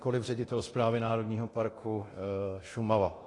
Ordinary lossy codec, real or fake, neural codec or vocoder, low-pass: AAC, 32 kbps; fake; codec, 24 kHz, 0.9 kbps, DualCodec; 10.8 kHz